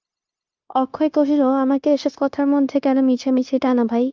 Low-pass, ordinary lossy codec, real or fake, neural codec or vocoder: 7.2 kHz; Opus, 32 kbps; fake; codec, 16 kHz, 0.9 kbps, LongCat-Audio-Codec